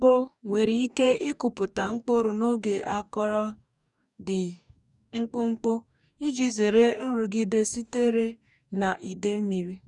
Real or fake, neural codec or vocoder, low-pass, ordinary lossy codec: fake; codec, 44.1 kHz, 2.6 kbps, DAC; 10.8 kHz; none